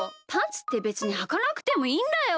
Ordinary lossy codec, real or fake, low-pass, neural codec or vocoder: none; real; none; none